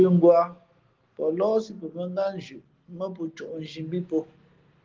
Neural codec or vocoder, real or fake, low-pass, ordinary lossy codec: none; real; 7.2 kHz; Opus, 16 kbps